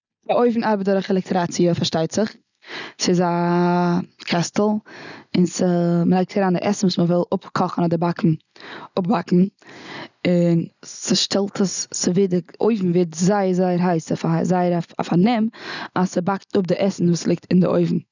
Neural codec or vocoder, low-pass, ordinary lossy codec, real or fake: none; 7.2 kHz; none; real